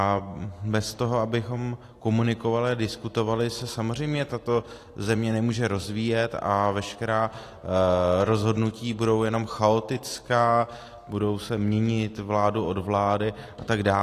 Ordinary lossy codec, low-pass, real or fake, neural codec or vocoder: AAC, 48 kbps; 14.4 kHz; real; none